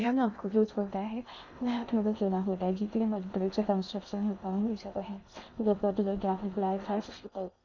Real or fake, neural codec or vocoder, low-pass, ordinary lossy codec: fake; codec, 16 kHz in and 24 kHz out, 0.6 kbps, FocalCodec, streaming, 2048 codes; 7.2 kHz; none